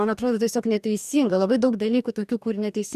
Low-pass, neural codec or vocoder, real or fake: 14.4 kHz; codec, 44.1 kHz, 2.6 kbps, DAC; fake